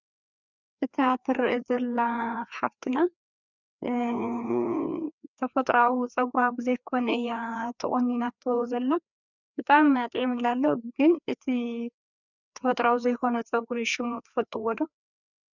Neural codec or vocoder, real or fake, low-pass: codec, 16 kHz, 2 kbps, FreqCodec, larger model; fake; 7.2 kHz